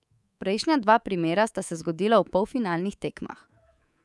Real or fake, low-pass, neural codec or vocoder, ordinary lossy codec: fake; none; codec, 24 kHz, 3.1 kbps, DualCodec; none